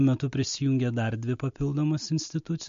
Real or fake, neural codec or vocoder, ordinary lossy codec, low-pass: real; none; MP3, 48 kbps; 7.2 kHz